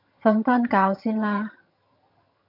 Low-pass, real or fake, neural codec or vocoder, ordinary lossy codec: 5.4 kHz; fake; vocoder, 22.05 kHz, 80 mel bands, HiFi-GAN; AAC, 48 kbps